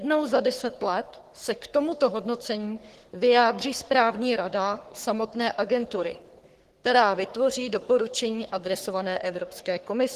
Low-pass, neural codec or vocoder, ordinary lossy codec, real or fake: 14.4 kHz; codec, 44.1 kHz, 3.4 kbps, Pupu-Codec; Opus, 16 kbps; fake